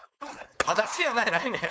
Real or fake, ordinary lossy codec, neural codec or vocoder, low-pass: fake; none; codec, 16 kHz, 4.8 kbps, FACodec; none